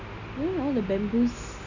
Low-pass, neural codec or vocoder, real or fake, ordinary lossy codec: 7.2 kHz; none; real; none